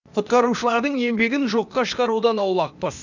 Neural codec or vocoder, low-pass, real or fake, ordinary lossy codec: codec, 16 kHz, 0.8 kbps, ZipCodec; 7.2 kHz; fake; none